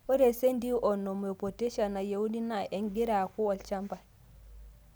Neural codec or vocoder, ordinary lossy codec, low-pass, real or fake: none; none; none; real